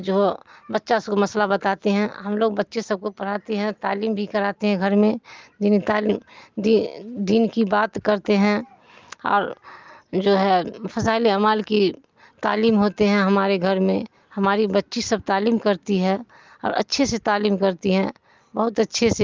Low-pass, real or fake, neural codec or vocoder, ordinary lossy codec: 7.2 kHz; real; none; Opus, 32 kbps